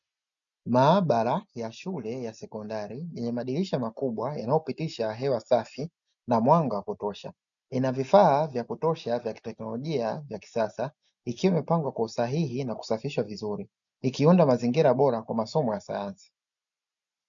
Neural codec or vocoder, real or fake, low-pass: none; real; 7.2 kHz